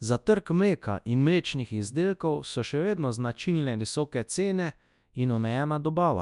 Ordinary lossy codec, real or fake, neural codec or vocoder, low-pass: none; fake; codec, 24 kHz, 0.9 kbps, WavTokenizer, large speech release; 10.8 kHz